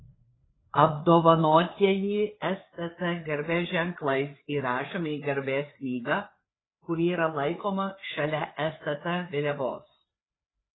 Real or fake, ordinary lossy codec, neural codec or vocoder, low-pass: fake; AAC, 16 kbps; codec, 16 kHz, 4 kbps, FreqCodec, larger model; 7.2 kHz